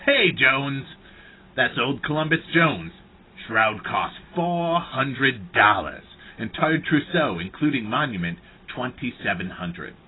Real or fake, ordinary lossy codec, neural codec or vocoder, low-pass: real; AAC, 16 kbps; none; 7.2 kHz